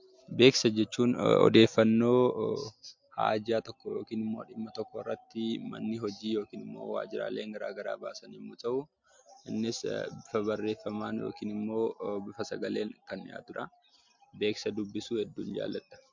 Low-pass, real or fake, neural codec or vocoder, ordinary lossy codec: 7.2 kHz; real; none; MP3, 64 kbps